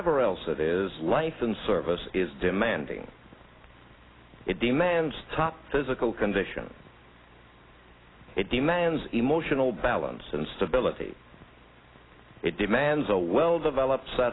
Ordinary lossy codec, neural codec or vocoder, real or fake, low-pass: AAC, 16 kbps; none; real; 7.2 kHz